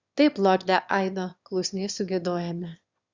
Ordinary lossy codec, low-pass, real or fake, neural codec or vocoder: Opus, 64 kbps; 7.2 kHz; fake; autoencoder, 22.05 kHz, a latent of 192 numbers a frame, VITS, trained on one speaker